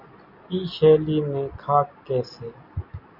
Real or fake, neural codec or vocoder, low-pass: real; none; 5.4 kHz